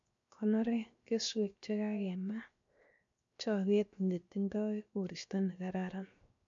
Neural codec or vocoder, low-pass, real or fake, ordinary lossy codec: codec, 16 kHz, 0.7 kbps, FocalCodec; 7.2 kHz; fake; MP3, 48 kbps